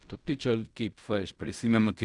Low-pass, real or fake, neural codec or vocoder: 10.8 kHz; fake; codec, 16 kHz in and 24 kHz out, 0.4 kbps, LongCat-Audio-Codec, fine tuned four codebook decoder